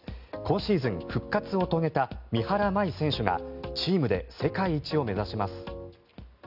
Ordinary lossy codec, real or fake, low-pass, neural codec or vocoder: none; real; 5.4 kHz; none